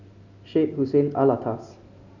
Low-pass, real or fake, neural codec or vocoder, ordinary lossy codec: 7.2 kHz; real; none; none